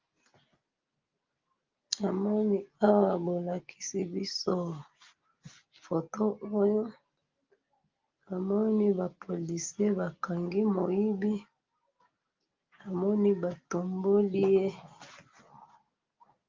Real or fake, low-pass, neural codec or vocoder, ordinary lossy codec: real; 7.2 kHz; none; Opus, 32 kbps